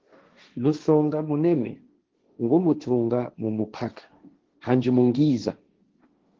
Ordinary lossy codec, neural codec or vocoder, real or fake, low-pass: Opus, 16 kbps; codec, 16 kHz, 1.1 kbps, Voila-Tokenizer; fake; 7.2 kHz